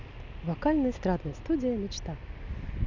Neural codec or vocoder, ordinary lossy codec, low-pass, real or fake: none; none; 7.2 kHz; real